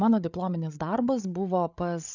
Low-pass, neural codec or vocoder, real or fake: 7.2 kHz; codec, 16 kHz, 8 kbps, FreqCodec, larger model; fake